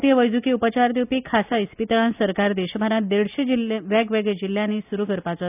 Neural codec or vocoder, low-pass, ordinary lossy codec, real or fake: none; 3.6 kHz; none; real